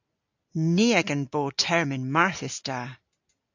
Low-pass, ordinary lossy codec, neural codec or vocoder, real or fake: 7.2 kHz; AAC, 48 kbps; none; real